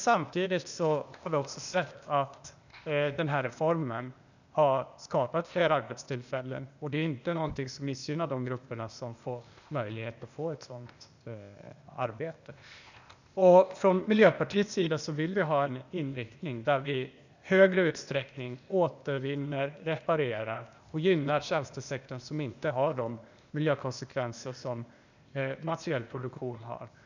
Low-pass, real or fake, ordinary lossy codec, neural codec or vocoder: 7.2 kHz; fake; none; codec, 16 kHz, 0.8 kbps, ZipCodec